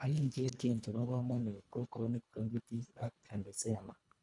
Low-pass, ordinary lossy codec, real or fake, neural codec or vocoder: 10.8 kHz; none; fake; codec, 24 kHz, 1.5 kbps, HILCodec